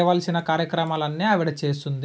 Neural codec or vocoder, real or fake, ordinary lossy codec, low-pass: none; real; none; none